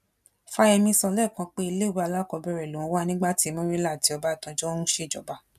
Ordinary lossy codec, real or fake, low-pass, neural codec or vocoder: none; real; 14.4 kHz; none